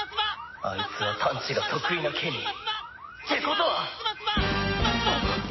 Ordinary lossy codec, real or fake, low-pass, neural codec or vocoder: MP3, 24 kbps; real; 7.2 kHz; none